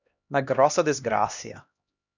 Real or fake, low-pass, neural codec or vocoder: fake; 7.2 kHz; codec, 16 kHz, 1 kbps, X-Codec, HuBERT features, trained on LibriSpeech